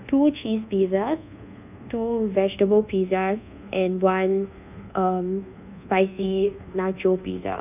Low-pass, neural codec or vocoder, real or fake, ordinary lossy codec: 3.6 kHz; codec, 24 kHz, 1.2 kbps, DualCodec; fake; none